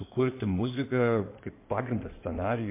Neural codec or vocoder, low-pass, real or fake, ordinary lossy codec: codec, 16 kHz in and 24 kHz out, 2.2 kbps, FireRedTTS-2 codec; 3.6 kHz; fake; MP3, 24 kbps